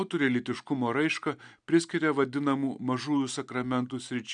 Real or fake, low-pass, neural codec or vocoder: real; 9.9 kHz; none